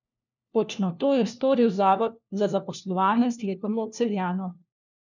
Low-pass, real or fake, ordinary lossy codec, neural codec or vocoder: 7.2 kHz; fake; none; codec, 16 kHz, 1 kbps, FunCodec, trained on LibriTTS, 50 frames a second